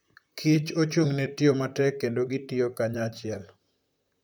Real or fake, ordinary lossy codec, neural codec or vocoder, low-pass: fake; none; vocoder, 44.1 kHz, 128 mel bands, Pupu-Vocoder; none